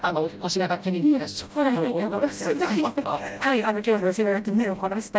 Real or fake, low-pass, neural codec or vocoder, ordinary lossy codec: fake; none; codec, 16 kHz, 0.5 kbps, FreqCodec, smaller model; none